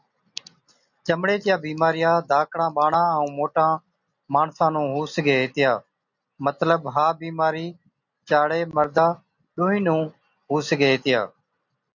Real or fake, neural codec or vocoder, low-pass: real; none; 7.2 kHz